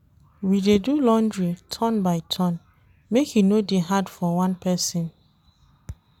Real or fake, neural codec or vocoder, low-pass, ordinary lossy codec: real; none; 19.8 kHz; none